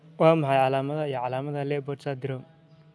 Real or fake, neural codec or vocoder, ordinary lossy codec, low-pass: real; none; none; none